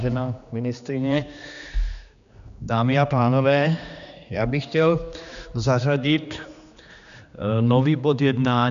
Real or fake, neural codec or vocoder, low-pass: fake; codec, 16 kHz, 2 kbps, X-Codec, HuBERT features, trained on general audio; 7.2 kHz